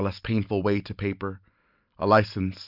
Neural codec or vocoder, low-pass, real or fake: none; 5.4 kHz; real